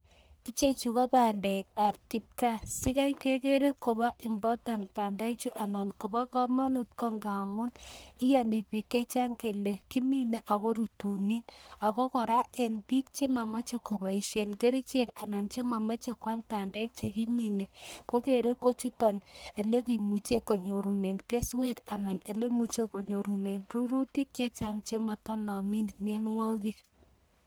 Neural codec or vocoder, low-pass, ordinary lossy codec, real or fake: codec, 44.1 kHz, 1.7 kbps, Pupu-Codec; none; none; fake